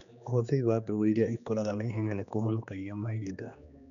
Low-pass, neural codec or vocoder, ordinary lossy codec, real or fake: 7.2 kHz; codec, 16 kHz, 2 kbps, X-Codec, HuBERT features, trained on general audio; none; fake